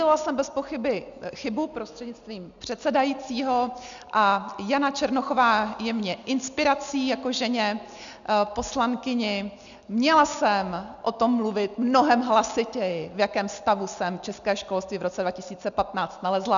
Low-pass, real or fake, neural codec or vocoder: 7.2 kHz; real; none